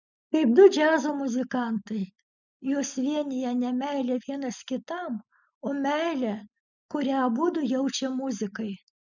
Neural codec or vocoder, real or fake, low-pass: none; real; 7.2 kHz